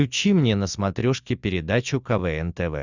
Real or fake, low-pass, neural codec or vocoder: real; 7.2 kHz; none